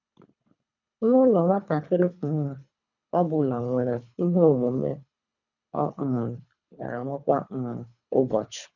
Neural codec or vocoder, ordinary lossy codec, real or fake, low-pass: codec, 24 kHz, 3 kbps, HILCodec; none; fake; 7.2 kHz